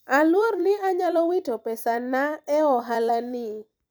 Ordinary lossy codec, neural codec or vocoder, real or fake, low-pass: none; vocoder, 44.1 kHz, 128 mel bands every 256 samples, BigVGAN v2; fake; none